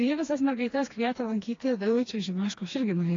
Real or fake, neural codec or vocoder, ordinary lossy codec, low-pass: fake; codec, 16 kHz, 2 kbps, FreqCodec, smaller model; AAC, 32 kbps; 7.2 kHz